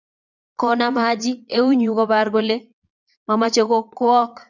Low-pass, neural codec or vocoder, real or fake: 7.2 kHz; vocoder, 22.05 kHz, 80 mel bands, Vocos; fake